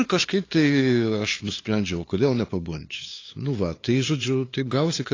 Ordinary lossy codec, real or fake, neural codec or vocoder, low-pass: AAC, 32 kbps; fake; codec, 16 kHz, 2 kbps, FunCodec, trained on LibriTTS, 25 frames a second; 7.2 kHz